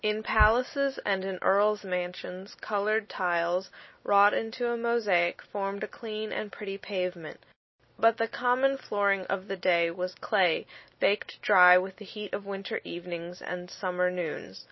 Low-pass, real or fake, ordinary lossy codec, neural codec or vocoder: 7.2 kHz; real; MP3, 24 kbps; none